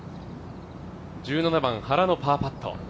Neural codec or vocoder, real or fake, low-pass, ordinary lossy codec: none; real; none; none